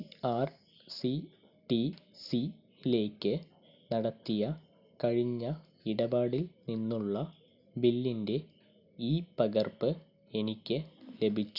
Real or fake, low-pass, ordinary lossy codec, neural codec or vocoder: real; 5.4 kHz; none; none